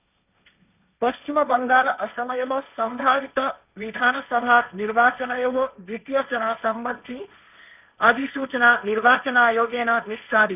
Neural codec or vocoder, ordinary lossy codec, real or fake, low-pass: codec, 16 kHz, 1.1 kbps, Voila-Tokenizer; none; fake; 3.6 kHz